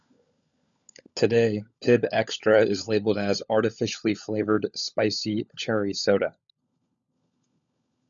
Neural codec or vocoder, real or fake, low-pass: codec, 16 kHz, 16 kbps, FunCodec, trained on LibriTTS, 50 frames a second; fake; 7.2 kHz